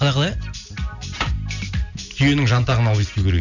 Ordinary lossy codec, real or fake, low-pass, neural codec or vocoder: none; real; 7.2 kHz; none